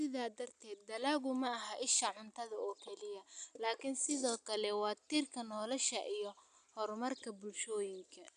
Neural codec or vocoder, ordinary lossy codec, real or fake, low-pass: none; none; real; 9.9 kHz